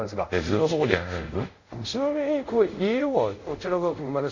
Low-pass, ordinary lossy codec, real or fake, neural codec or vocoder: 7.2 kHz; none; fake; codec, 24 kHz, 0.5 kbps, DualCodec